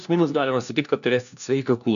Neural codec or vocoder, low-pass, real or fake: codec, 16 kHz, 1 kbps, FunCodec, trained on LibriTTS, 50 frames a second; 7.2 kHz; fake